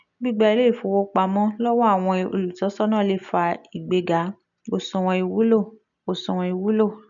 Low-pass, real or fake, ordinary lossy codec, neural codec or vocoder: 7.2 kHz; real; none; none